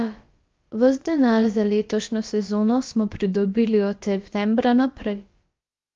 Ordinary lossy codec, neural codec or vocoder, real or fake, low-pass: Opus, 32 kbps; codec, 16 kHz, about 1 kbps, DyCAST, with the encoder's durations; fake; 7.2 kHz